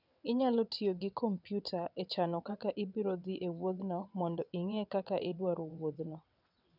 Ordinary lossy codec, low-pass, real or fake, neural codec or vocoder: none; 5.4 kHz; fake; vocoder, 22.05 kHz, 80 mel bands, WaveNeXt